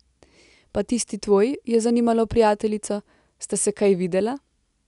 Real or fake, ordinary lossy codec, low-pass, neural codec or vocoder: real; none; 10.8 kHz; none